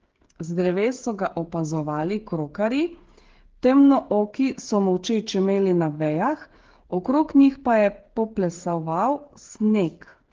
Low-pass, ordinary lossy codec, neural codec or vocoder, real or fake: 7.2 kHz; Opus, 16 kbps; codec, 16 kHz, 8 kbps, FreqCodec, smaller model; fake